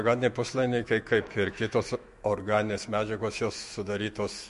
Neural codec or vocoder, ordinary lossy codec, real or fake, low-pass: autoencoder, 48 kHz, 128 numbers a frame, DAC-VAE, trained on Japanese speech; MP3, 48 kbps; fake; 14.4 kHz